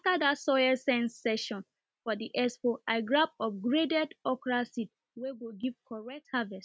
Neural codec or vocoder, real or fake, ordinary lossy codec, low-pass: none; real; none; none